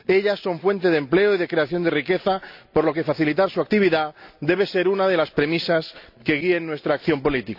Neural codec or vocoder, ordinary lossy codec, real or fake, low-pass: none; AAC, 48 kbps; real; 5.4 kHz